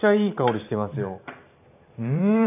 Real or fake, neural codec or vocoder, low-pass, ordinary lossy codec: fake; vocoder, 44.1 kHz, 128 mel bands every 512 samples, BigVGAN v2; 3.6 kHz; none